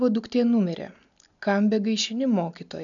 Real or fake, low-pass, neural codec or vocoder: real; 7.2 kHz; none